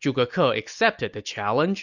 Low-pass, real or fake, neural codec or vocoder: 7.2 kHz; real; none